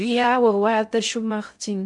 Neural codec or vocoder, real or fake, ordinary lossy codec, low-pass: codec, 16 kHz in and 24 kHz out, 0.6 kbps, FocalCodec, streaming, 2048 codes; fake; MP3, 64 kbps; 10.8 kHz